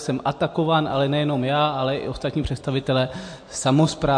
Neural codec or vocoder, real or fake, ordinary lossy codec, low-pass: none; real; MP3, 48 kbps; 9.9 kHz